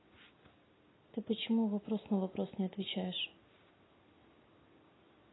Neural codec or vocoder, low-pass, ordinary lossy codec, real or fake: none; 7.2 kHz; AAC, 16 kbps; real